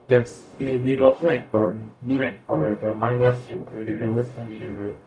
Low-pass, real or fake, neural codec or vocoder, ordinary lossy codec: 9.9 kHz; fake; codec, 44.1 kHz, 0.9 kbps, DAC; none